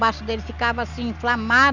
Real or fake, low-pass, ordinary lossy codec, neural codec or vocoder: real; 7.2 kHz; Opus, 64 kbps; none